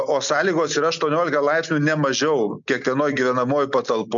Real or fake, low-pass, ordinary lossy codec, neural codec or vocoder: real; 7.2 kHz; MP3, 64 kbps; none